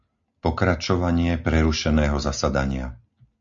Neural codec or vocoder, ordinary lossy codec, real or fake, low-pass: none; AAC, 64 kbps; real; 7.2 kHz